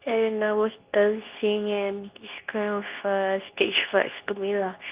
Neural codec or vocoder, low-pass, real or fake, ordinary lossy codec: codec, 24 kHz, 0.9 kbps, WavTokenizer, medium speech release version 2; 3.6 kHz; fake; Opus, 32 kbps